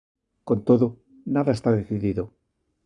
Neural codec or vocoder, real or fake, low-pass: codec, 44.1 kHz, 7.8 kbps, Pupu-Codec; fake; 10.8 kHz